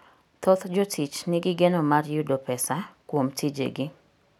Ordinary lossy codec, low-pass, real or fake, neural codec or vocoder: none; 19.8 kHz; real; none